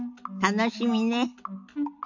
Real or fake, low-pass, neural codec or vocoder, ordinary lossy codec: real; 7.2 kHz; none; none